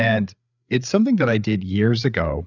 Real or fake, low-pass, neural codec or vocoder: fake; 7.2 kHz; codec, 16 kHz, 8 kbps, FreqCodec, larger model